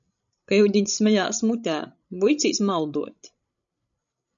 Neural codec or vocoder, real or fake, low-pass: codec, 16 kHz, 16 kbps, FreqCodec, larger model; fake; 7.2 kHz